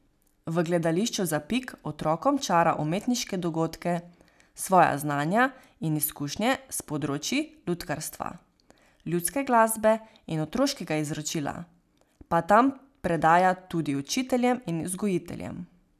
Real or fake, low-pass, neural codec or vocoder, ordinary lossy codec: real; 14.4 kHz; none; none